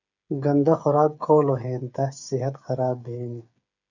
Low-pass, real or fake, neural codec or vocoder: 7.2 kHz; fake; codec, 16 kHz, 8 kbps, FreqCodec, smaller model